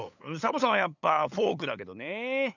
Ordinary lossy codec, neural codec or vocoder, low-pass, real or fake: none; codec, 16 kHz, 8 kbps, FunCodec, trained on LibriTTS, 25 frames a second; 7.2 kHz; fake